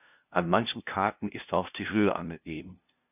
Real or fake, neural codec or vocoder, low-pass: fake; codec, 16 kHz, 0.5 kbps, FunCodec, trained on LibriTTS, 25 frames a second; 3.6 kHz